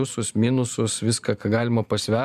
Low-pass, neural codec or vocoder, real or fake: 14.4 kHz; vocoder, 44.1 kHz, 128 mel bands every 512 samples, BigVGAN v2; fake